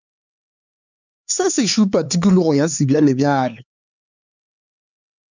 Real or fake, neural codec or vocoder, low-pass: fake; codec, 16 kHz, 4 kbps, X-Codec, HuBERT features, trained on LibriSpeech; 7.2 kHz